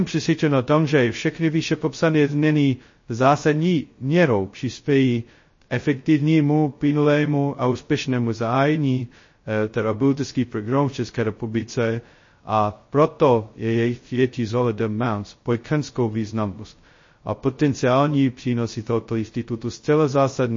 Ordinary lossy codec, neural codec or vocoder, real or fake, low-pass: MP3, 32 kbps; codec, 16 kHz, 0.2 kbps, FocalCodec; fake; 7.2 kHz